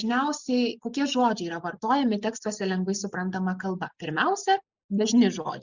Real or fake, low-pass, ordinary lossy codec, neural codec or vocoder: real; 7.2 kHz; Opus, 64 kbps; none